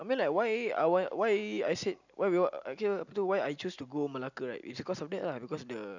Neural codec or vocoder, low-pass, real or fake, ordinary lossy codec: none; 7.2 kHz; real; none